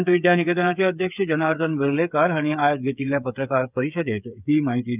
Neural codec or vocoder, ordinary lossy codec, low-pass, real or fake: codec, 16 kHz, 8 kbps, FreqCodec, smaller model; none; 3.6 kHz; fake